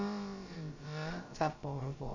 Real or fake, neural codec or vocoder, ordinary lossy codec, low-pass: fake; codec, 16 kHz, about 1 kbps, DyCAST, with the encoder's durations; Opus, 64 kbps; 7.2 kHz